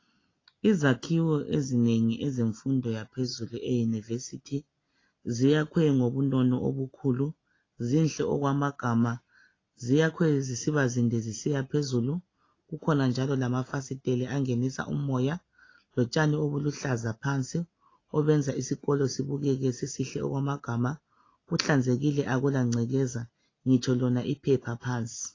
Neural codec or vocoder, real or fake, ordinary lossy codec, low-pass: none; real; AAC, 32 kbps; 7.2 kHz